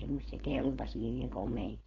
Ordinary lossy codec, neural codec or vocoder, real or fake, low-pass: AAC, 32 kbps; codec, 16 kHz, 4.8 kbps, FACodec; fake; 7.2 kHz